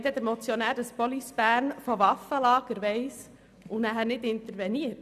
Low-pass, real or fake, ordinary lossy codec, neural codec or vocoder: 14.4 kHz; real; none; none